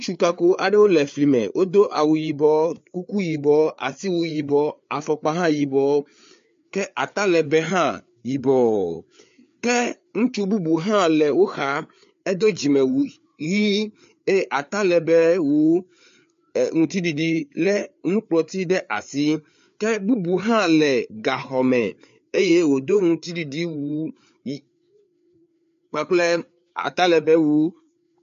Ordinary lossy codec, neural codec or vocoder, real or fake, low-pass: MP3, 48 kbps; codec, 16 kHz, 4 kbps, FreqCodec, larger model; fake; 7.2 kHz